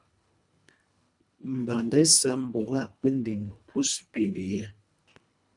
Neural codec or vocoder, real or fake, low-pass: codec, 24 kHz, 1.5 kbps, HILCodec; fake; 10.8 kHz